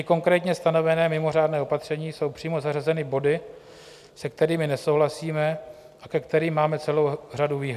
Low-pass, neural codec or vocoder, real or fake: 14.4 kHz; none; real